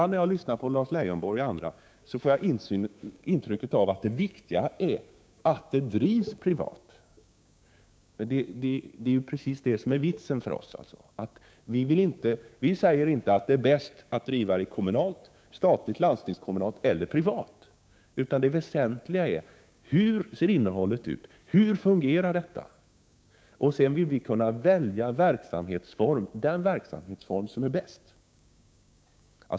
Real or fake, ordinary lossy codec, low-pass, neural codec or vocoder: fake; none; none; codec, 16 kHz, 6 kbps, DAC